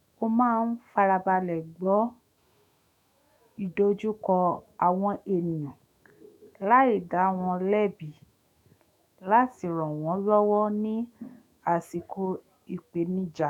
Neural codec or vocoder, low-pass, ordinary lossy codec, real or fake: autoencoder, 48 kHz, 128 numbers a frame, DAC-VAE, trained on Japanese speech; 19.8 kHz; none; fake